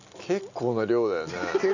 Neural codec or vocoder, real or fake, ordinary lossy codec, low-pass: none; real; none; 7.2 kHz